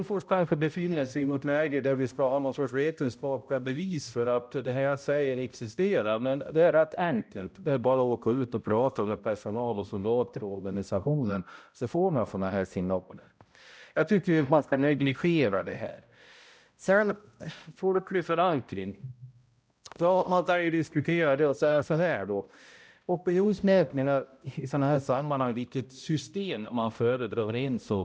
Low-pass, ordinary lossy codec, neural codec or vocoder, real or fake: none; none; codec, 16 kHz, 0.5 kbps, X-Codec, HuBERT features, trained on balanced general audio; fake